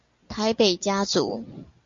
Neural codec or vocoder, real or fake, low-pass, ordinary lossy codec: none; real; 7.2 kHz; Opus, 64 kbps